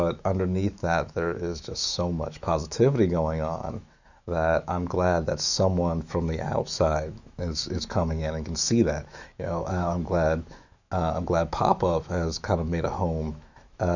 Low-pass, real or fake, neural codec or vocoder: 7.2 kHz; fake; autoencoder, 48 kHz, 128 numbers a frame, DAC-VAE, trained on Japanese speech